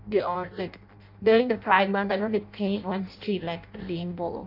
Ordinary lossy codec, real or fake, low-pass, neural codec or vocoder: none; fake; 5.4 kHz; codec, 16 kHz in and 24 kHz out, 0.6 kbps, FireRedTTS-2 codec